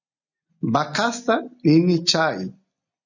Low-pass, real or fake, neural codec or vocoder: 7.2 kHz; real; none